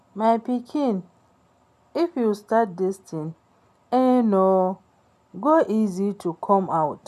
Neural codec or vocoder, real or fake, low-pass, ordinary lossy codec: none; real; 14.4 kHz; none